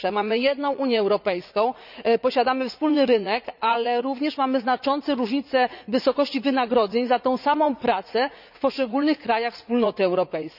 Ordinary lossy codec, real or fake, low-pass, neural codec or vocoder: none; fake; 5.4 kHz; vocoder, 44.1 kHz, 80 mel bands, Vocos